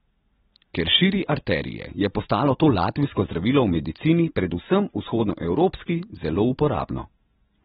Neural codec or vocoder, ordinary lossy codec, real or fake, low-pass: none; AAC, 16 kbps; real; 19.8 kHz